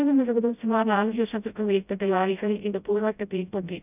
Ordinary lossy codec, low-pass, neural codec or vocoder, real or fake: none; 3.6 kHz; codec, 16 kHz, 0.5 kbps, FreqCodec, smaller model; fake